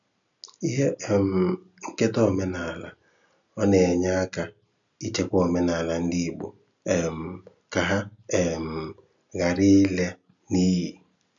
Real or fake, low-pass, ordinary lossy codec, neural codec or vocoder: real; 7.2 kHz; none; none